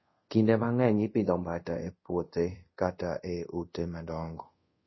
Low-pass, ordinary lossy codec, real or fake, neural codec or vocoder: 7.2 kHz; MP3, 24 kbps; fake; codec, 24 kHz, 0.5 kbps, DualCodec